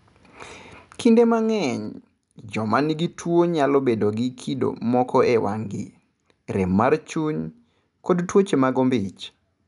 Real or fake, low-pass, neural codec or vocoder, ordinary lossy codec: real; 10.8 kHz; none; none